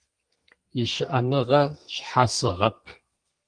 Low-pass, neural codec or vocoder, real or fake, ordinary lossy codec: 9.9 kHz; codec, 32 kHz, 1.9 kbps, SNAC; fake; Opus, 24 kbps